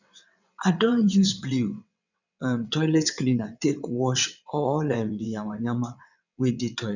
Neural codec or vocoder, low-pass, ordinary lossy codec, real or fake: vocoder, 22.05 kHz, 80 mel bands, WaveNeXt; 7.2 kHz; none; fake